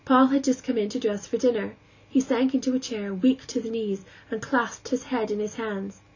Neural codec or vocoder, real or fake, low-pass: none; real; 7.2 kHz